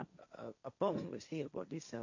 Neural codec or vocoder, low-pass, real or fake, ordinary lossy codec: codec, 16 kHz, 1.1 kbps, Voila-Tokenizer; 7.2 kHz; fake; none